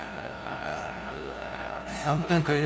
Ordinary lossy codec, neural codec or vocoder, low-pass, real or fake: none; codec, 16 kHz, 0.5 kbps, FunCodec, trained on LibriTTS, 25 frames a second; none; fake